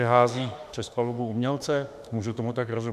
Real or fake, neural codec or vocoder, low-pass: fake; autoencoder, 48 kHz, 32 numbers a frame, DAC-VAE, trained on Japanese speech; 14.4 kHz